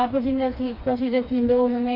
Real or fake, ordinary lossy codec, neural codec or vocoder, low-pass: fake; none; codec, 16 kHz, 2 kbps, FreqCodec, smaller model; 5.4 kHz